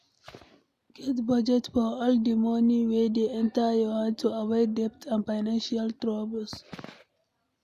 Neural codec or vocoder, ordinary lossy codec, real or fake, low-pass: none; none; real; 14.4 kHz